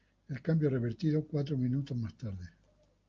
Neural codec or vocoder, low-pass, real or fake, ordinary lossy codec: none; 7.2 kHz; real; Opus, 24 kbps